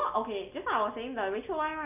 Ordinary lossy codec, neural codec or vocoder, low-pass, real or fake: none; none; 3.6 kHz; real